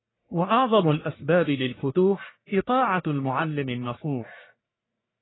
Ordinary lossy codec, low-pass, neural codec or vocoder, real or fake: AAC, 16 kbps; 7.2 kHz; codec, 44.1 kHz, 1.7 kbps, Pupu-Codec; fake